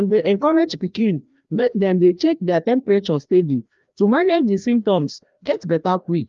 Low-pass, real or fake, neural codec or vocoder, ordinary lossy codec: 7.2 kHz; fake; codec, 16 kHz, 1 kbps, FreqCodec, larger model; Opus, 32 kbps